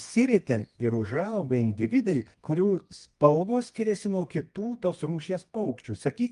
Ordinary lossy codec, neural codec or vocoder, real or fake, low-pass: Opus, 32 kbps; codec, 24 kHz, 0.9 kbps, WavTokenizer, medium music audio release; fake; 10.8 kHz